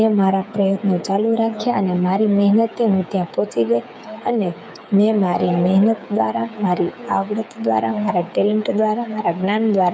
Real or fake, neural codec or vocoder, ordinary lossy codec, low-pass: fake; codec, 16 kHz, 8 kbps, FreqCodec, smaller model; none; none